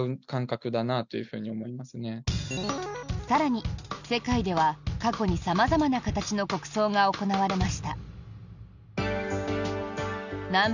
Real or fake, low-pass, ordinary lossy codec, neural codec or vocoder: real; 7.2 kHz; none; none